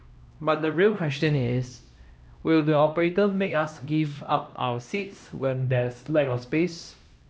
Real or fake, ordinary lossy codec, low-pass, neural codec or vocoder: fake; none; none; codec, 16 kHz, 1 kbps, X-Codec, HuBERT features, trained on LibriSpeech